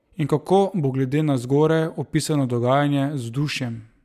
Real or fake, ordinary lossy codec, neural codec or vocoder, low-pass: real; none; none; 14.4 kHz